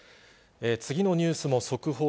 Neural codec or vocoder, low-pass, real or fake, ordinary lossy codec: none; none; real; none